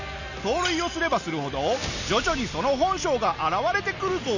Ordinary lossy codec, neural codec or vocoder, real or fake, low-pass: none; none; real; 7.2 kHz